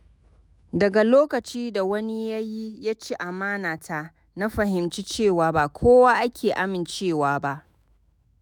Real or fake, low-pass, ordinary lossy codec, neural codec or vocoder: fake; none; none; autoencoder, 48 kHz, 128 numbers a frame, DAC-VAE, trained on Japanese speech